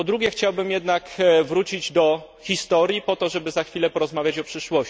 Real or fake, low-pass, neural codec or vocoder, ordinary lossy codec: real; none; none; none